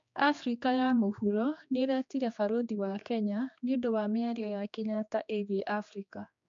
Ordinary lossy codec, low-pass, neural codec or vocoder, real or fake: MP3, 64 kbps; 7.2 kHz; codec, 16 kHz, 2 kbps, X-Codec, HuBERT features, trained on general audio; fake